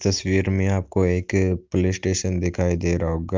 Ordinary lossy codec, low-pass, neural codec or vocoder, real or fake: Opus, 32 kbps; 7.2 kHz; none; real